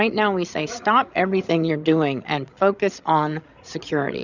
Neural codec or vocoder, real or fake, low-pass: codec, 16 kHz, 16 kbps, FreqCodec, larger model; fake; 7.2 kHz